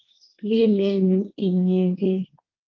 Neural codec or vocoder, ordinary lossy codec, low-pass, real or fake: codec, 24 kHz, 1 kbps, SNAC; Opus, 32 kbps; 7.2 kHz; fake